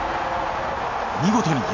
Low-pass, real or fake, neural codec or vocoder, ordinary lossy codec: 7.2 kHz; real; none; none